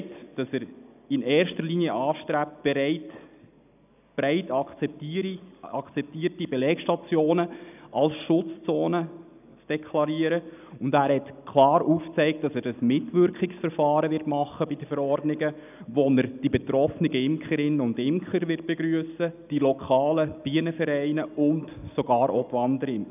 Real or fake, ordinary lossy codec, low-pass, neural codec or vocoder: real; none; 3.6 kHz; none